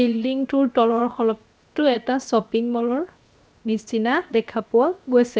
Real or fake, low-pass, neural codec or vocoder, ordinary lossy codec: fake; none; codec, 16 kHz, 0.7 kbps, FocalCodec; none